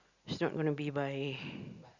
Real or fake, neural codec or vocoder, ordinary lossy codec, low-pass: real; none; Opus, 64 kbps; 7.2 kHz